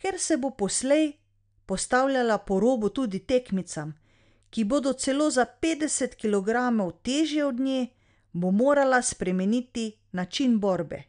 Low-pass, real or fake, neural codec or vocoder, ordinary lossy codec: 9.9 kHz; real; none; none